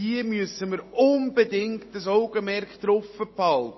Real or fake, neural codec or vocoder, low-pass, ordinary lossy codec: real; none; 7.2 kHz; MP3, 24 kbps